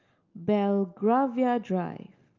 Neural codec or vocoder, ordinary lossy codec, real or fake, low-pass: none; Opus, 24 kbps; real; 7.2 kHz